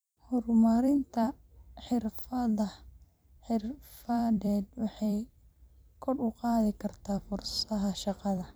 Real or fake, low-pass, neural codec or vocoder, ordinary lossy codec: fake; none; vocoder, 44.1 kHz, 128 mel bands every 256 samples, BigVGAN v2; none